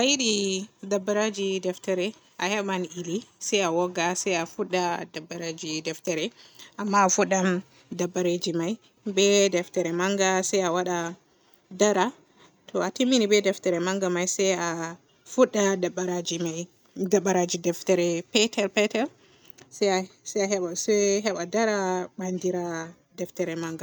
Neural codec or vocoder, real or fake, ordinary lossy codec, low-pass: none; real; none; none